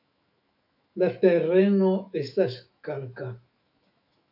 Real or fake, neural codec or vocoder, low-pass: fake; autoencoder, 48 kHz, 128 numbers a frame, DAC-VAE, trained on Japanese speech; 5.4 kHz